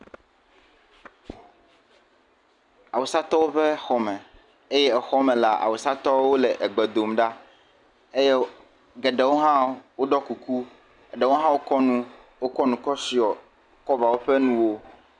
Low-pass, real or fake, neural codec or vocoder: 10.8 kHz; real; none